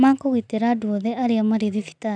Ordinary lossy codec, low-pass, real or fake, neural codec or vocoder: none; 9.9 kHz; real; none